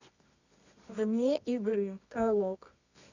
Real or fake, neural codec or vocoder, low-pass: fake; codec, 24 kHz, 0.9 kbps, WavTokenizer, medium music audio release; 7.2 kHz